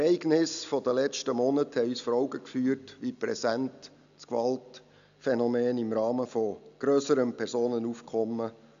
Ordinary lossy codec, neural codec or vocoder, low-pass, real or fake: AAC, 96 kbps; none; 7.2 kHz; real